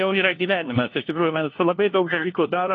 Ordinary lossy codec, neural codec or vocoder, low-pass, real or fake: AAC, 32 kbps; codec, 16 kHz, 1 kbps, FunCodec, trained on LibriTTS, 50 frames a second; 7.2 kHz; fake